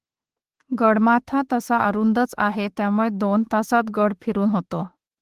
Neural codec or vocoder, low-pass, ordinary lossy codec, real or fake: autoencoder, 48 kHz, 32 numbers a frame, DAC-VAE, trained on Japanese speech; 14.4 kHz; Opus, 16 kbps; fake